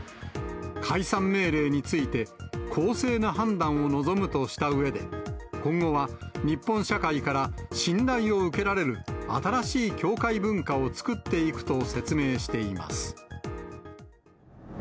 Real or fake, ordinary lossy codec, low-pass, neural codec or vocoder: real; none; none; none